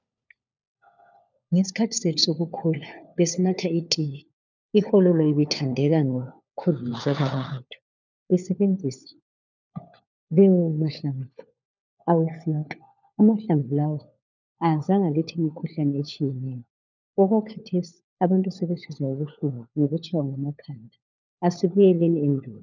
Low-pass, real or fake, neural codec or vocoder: 7.2 kHz; fake; codec, 16 kHz, 4 kbps, FunCodec, trained on LibriTTS, 50 frames a second